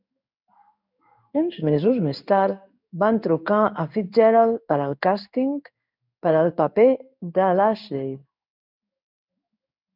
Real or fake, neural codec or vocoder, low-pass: fake; codec, 16 kHz in and 24 kHz out, 1 kbps, XY-Tokenizer; 5.4 kHz